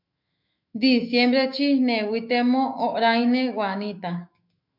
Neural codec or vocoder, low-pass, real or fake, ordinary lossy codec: autoencoder, 48 kHz, 128 numbers a frame, DAC-VAE, trained on Japanese speech; 5.4 kHz; fake; MP3, 48 kbps